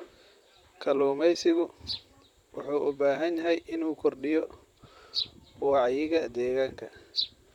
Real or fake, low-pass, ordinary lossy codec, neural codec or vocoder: fake; 19.8 kHz; none; vocoder, 44.1 kHz, 128 mel bands, Pupu-Vocoder